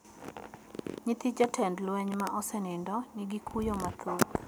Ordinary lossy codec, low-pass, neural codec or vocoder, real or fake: none; none; none; real